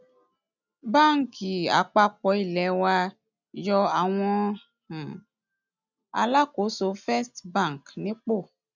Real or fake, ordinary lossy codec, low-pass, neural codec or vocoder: real; none; 7.2 kHz; none